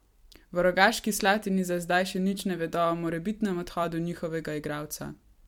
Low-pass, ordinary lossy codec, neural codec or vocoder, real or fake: 19.8 kHz; MP3, 96 kbps; vocoder, 44.1 kHz, 128 mel bands every 256 samples, BigVGAN v2; fake